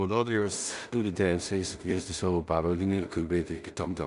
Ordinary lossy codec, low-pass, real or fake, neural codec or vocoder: AAC, 96 kbps; 10.8 kHz; fake; codec, 16 kHz in and 24 kHz out, 0.4 kbps, LongCat-Audio-Codec, two codebook decoder